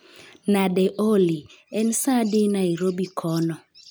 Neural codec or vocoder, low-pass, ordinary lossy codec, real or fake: none; none; none; real